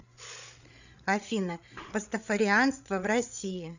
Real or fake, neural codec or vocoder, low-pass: fake; codec, 16 kHz, 8 kbps, FreqCodec, larger model; 7.2 kHz